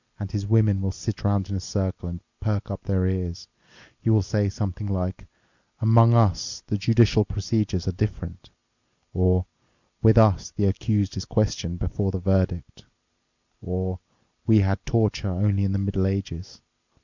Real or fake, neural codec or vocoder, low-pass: real; none; 7.2 kHz